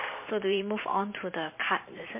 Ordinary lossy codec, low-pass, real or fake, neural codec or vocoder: MP3, 24 kbps; 3.6 kHz; fake; vocoder, 44.1 kHz, 128 mel bands every 512 samples, BigVGAN v2